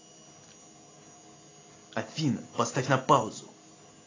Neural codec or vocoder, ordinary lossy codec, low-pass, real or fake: none; AAC, 32 kbps; 7.2 kHz; real